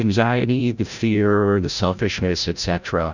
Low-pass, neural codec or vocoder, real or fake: 7.2 kHz; codec, 16 kHz, 0.5 kbps, FreqCodec, larger model; fake